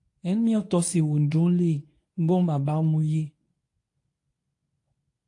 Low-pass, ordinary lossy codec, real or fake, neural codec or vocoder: 10.8 kHz; AAC, 48 kbps; fake; codec, 24 kHz, 0.9 kbps, WavTokenizer, medium speech release version 1